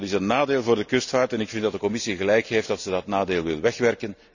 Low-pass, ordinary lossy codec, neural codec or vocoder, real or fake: 7.2 kHz; none; none; real